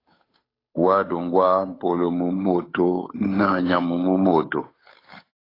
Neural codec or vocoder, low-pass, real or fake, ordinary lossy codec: codec, 16 kHz, 8 kbps, FunCodec, trained on Chinese and English, 25 frames a second; 5.4 kHz; fake; AAC, 24 kbps